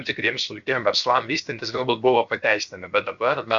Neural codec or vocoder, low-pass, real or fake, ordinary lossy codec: codec, 16 kHz, 0.7 kbps, FocalCodec; 7.2 kHz; fake; Opus, 64 kbps